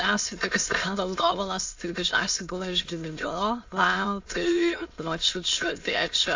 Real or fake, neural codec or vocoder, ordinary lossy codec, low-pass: fake; autoencoder, 22.05 kHz, a latent of 192 numbers a frame, VITS, trained on many speakers; AAC, 48 kbps; 7.2 kHz